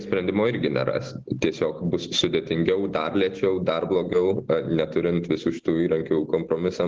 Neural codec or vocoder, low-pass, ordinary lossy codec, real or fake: none; 7.2 kHz; Opus, 16 kbps; real